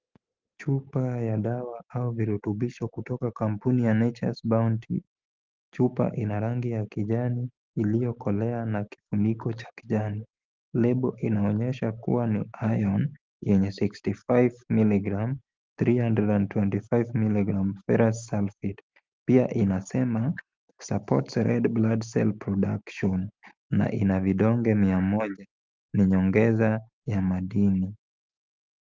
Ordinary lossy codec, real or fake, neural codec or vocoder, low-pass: Opus, 32 kbps; real; none; 7.2 kHz